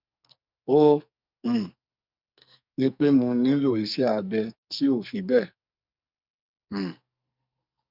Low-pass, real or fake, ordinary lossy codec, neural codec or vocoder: 5.4 kHz; fake; none; codec, 44.1 kHz, 2.6 kbps, SNAC